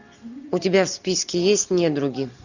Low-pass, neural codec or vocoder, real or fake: 7.2 kHz; none; real